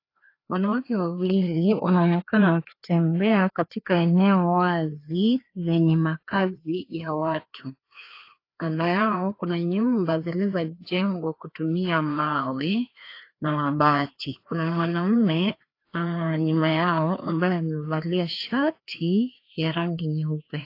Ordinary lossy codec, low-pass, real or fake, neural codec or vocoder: AAC, 32 kbps; 5.4 kHz; fake; codec, 16 kHz, 2 kbps, FreqCodec, larger model